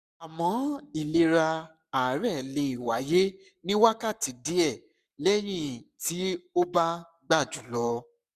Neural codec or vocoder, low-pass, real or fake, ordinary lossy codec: codec, 44.1 kHz, 7.8 kbps, Pupu-Codec; 14.4 kHz; fake; none